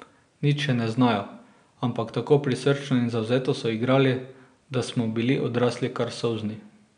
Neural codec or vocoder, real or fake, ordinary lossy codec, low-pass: none; real; MP3, 96 kbps; 9.9 kHz